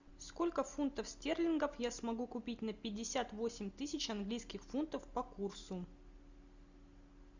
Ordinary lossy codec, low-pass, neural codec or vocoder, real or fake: Opus, 64 kbps; 7.2 kHz; none; real